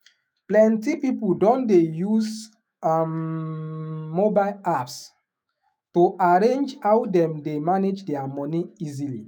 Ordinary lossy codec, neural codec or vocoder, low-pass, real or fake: none; autoencoder, 48 kHz, 128 numbers a frame, DAC-VAE, trained on Japanese speech; none; fake